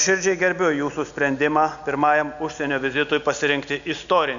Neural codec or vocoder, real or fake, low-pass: none; real; 7.2 kHz